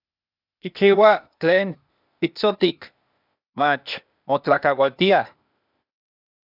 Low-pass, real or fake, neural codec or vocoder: 5.4 kHz; fake; codec, 16 kHz, 0.8 kbps, ZipCodec